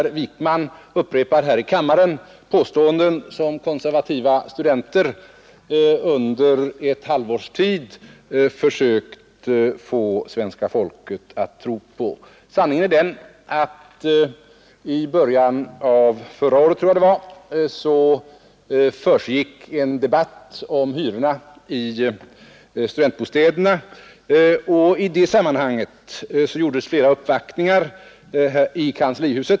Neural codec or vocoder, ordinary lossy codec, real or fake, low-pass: none; none; real; none